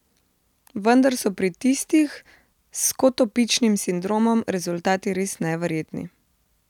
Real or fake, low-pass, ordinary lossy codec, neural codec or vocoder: real; 19.8 kHz; none; none